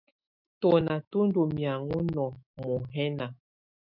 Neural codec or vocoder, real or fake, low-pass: autoencoder, 48 kHz, 128 numbers a frame, DAC-VAE, trained on Japanese speech; fake; 5.4 kHz